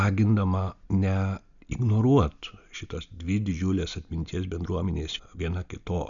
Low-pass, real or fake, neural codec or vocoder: 7.2 kHz; real; none